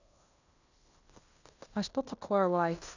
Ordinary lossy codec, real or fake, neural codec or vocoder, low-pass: none; fake; codec, 16 kHz in and 24 kHz out, 0.9 kbps, LongCat-Audio-Codec, fine tuned four codebook decoder; 7.2 kHz